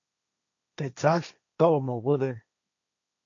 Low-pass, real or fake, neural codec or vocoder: 7.2 kHz; fake; codec, 16 kHz, 1.1 kbps, Voila-Tokenizer